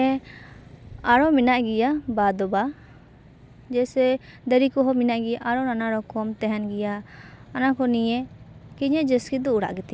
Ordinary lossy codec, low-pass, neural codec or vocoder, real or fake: none; none; none; real